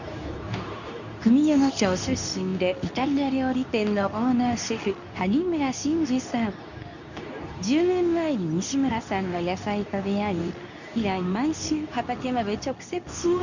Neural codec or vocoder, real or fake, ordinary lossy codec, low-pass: codec, 24 kHz, 0.9 kbps, WavTokenizer, medium speech release version 2; fake; none; 7.2 kHz